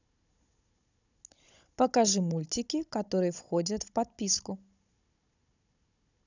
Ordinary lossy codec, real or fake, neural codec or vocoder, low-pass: none; fake; codec, 16 kHz, 16 kbps, FunCodec, trained on Chinese and English, 50 frames a second; 7.2 kHz